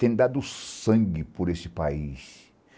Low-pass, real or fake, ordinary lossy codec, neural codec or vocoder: none; real; none; none